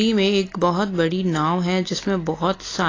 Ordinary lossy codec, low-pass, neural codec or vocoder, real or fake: AAC, 32 kbps; 7.2 kHz; none; real